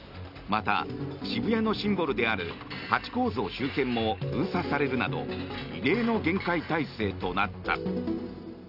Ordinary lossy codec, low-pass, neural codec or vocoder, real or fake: none; 5.4 kHz; none; real